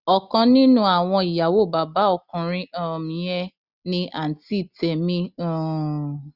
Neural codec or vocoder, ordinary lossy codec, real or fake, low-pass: none; Opus, 64 kbps; real; 5.4 kHz